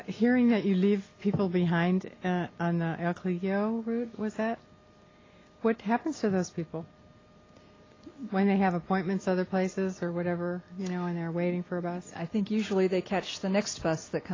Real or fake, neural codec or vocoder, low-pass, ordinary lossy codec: real; none; 7.2 kHz; AAC, 32 kbps